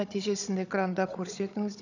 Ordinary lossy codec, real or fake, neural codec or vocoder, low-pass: none; fake; codec, 16 kHz, 16 kbps, FunCodec, trained on Chinese and English, 50 frames a second; 7.2 kHz